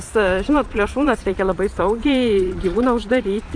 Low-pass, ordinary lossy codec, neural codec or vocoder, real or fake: 9.9 kHz; AAC, 64 kbps; vocoder, 22.05 kHz, 80 mel bands, Vocos; fake